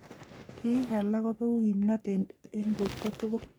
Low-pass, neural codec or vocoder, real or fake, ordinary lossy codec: none; codec, 44.1 kHz, 3.4 kbps, Pupu-Codec; fake; none